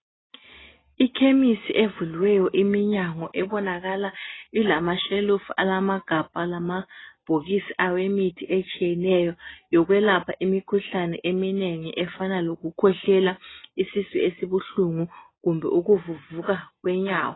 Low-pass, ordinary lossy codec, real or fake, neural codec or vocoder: 7.2 kHz; AAC, 16 kbps; real; none